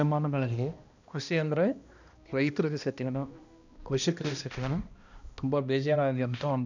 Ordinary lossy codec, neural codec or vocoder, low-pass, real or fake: none; codec, 16 kHz, 1 kbps, X-Codec, HuBERT features, trained on balanced general audio; 7.2 kHz; fake